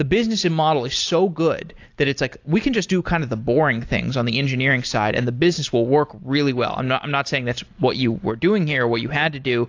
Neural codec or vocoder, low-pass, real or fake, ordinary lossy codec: none; 7.2 kHz; real; AAC, 48 kbps